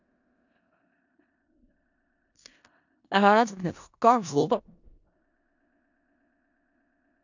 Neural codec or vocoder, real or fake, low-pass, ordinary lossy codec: codec, 16 kHz in and 24 kHz out, 0.4 kbps, LongCat-Audio-Codec, four codebook decoder; fake; 7.2 kHz; none